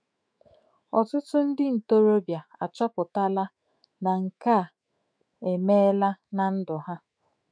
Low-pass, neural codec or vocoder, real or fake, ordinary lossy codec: 9.9 kHz; autoencoder, 48 kHz, 128 numbers a frame, DAC-VAE, trained on Japanese speech; fake; none